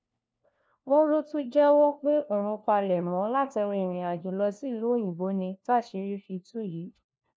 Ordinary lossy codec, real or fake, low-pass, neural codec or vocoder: none; fake; none; codec, 16 kHz, 1 kbps, FunCodec, trained on LibriTTS, 50 frames a second